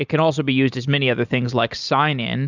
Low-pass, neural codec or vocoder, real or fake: 7.2 kHz; none; real